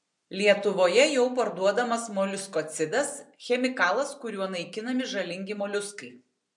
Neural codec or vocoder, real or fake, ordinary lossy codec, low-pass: none; real; MP3, 64 kbps; 10.8 kHz